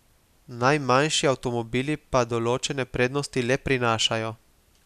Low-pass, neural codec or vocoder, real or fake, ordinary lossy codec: 14.4 kHz; none; real; none